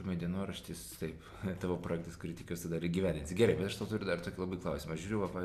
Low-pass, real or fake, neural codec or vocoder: 14.4 kHz; real; none